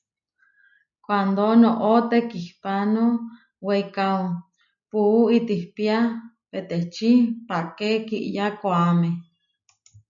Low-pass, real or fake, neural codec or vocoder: 7.2 kHz; real; none